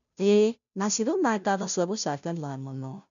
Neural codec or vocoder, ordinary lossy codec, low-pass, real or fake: codec, 16 kHz, 0.5 kbps, FunCodec, trained on Chinese and English, 25 frames a second; none; 7.2 kHz; fake